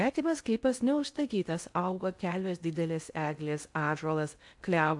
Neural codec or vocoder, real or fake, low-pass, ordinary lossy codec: codec, 16 kHz in and 24 kHz out, 0.6 kbps, FocalCodec, streaming, 2048 codes; fake; 10.8 kHz; AAC, 64 kbps